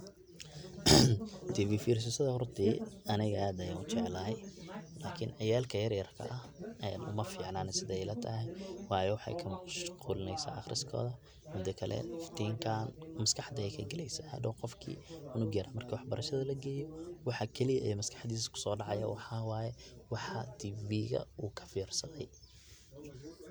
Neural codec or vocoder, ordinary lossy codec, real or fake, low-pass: none; none; real; none